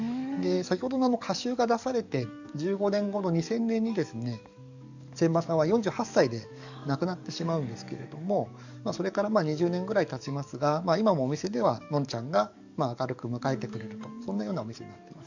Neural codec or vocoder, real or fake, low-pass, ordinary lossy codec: codec, 44.1 kHz, 7.8 kbps, DAC; fake; 7.2 kHz; none